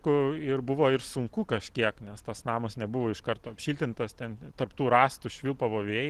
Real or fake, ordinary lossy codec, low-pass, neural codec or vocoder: real; Opus, 16 kbps; 14.4 kHz; none